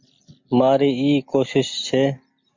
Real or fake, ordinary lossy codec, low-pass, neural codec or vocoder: real; MP3, 48 kbps; 7.2 kHz; none